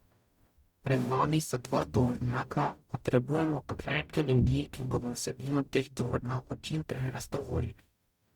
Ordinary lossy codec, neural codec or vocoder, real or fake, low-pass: Opus, 64 kbps; codec, 44.1 kHz, 0.9 kbps, DAC; fake; 19.8 kHz